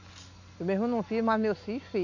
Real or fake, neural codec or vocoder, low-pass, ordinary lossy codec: real; none; 7.2 kHz; none